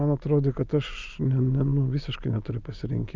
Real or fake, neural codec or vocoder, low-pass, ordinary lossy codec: real; none; 7.2 kHz; Opus, 24 kbps